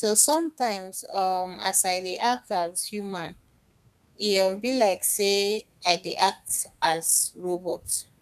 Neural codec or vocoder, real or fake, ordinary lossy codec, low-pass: codec, 32 kHz, 1.9 kbps, SNAC; fake; none; 14.4 kHz